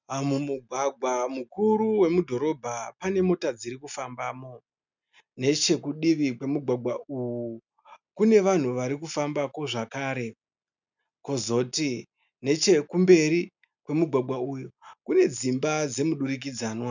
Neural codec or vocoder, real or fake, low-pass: none; real; 7.2 kHz